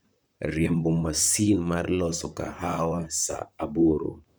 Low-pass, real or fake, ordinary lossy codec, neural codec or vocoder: none; fake; none; vocoder, 44.1 kHz, 128 mel bands, Pupu-Vocoder